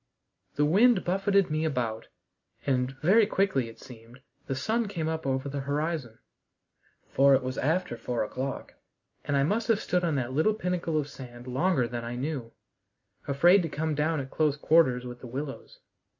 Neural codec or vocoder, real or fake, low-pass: none; real; 7.2 kHz